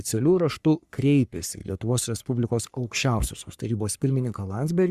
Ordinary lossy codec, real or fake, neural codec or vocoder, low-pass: Opus, 64 kbps; fake; codec, 44.1 kHz, 3.4 kbps, Pupu-Codec; 14.4 kHz